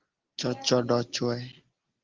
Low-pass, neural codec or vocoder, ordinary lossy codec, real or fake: 7.2 kHz; none; Opus, 16 kbps; real